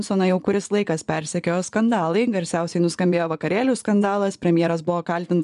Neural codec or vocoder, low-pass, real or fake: vocoder, 24 kHz, 100 mel bands, Vocos; 10.8 kHz; fake